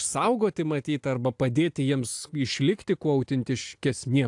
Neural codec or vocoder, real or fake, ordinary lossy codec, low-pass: none; real; AAC, 64 kbps; 10.8 kHz